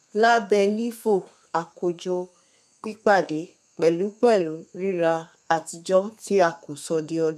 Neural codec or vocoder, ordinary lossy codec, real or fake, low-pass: codec, 32 kHz, 1.9 kbps, SNAC; none; fake; 14.4 kHz